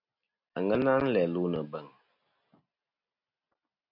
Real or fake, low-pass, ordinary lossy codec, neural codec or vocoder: real; 5.4 kHz; MP3, 48 kbps; none